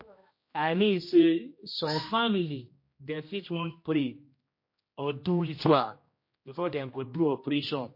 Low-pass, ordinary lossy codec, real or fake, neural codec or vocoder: 5.4 kHz; MP3, 32 kbps; fake; codec, 16 kHz, 1 kbps, X-Codec, HuBERT features, trained on general audio